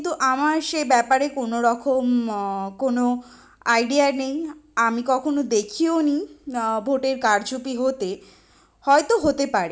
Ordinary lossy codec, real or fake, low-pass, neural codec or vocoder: none; real; none; none